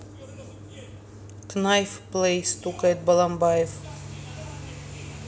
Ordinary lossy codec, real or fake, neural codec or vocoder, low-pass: none; real; none; none